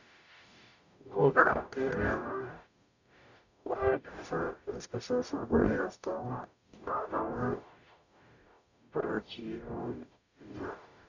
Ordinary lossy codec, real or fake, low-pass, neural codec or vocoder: none; fake; 7.2 kHz; codec, 44.1 kHz, 0.9 kbps, DAC